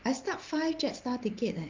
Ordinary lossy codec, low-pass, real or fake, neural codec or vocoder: Opus, 32 kbps; 7.2 kHz; real; none